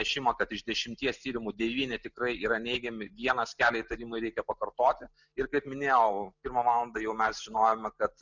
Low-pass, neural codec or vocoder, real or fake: 7.2 kHz; none; real